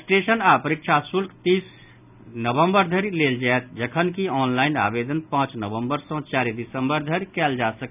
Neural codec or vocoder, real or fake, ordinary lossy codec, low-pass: none; real; none; 3.6 kHz